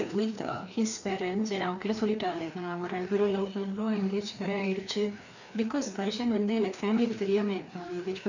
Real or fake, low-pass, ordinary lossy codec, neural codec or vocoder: fake; 7.2 kHz; none; codec, 16 kHz, 2 kbps, FreqCodec, larger model